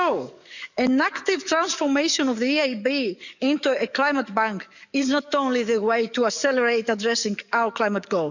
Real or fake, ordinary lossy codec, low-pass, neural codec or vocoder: fake; none; 7.2 kHz; codec, 44.1 kHz, 7.8 kbps, DAC